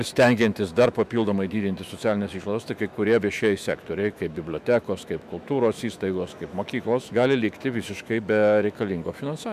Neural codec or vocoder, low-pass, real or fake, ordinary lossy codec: autoencoder, 48 kHz, 128 numbers a frame, DAC-VAE, trained on Japanese speech; 14.4 kHz; fake; MP3, 96 kbps